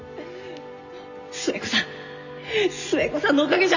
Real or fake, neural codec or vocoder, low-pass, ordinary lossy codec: real; none; 7.2 kHz; none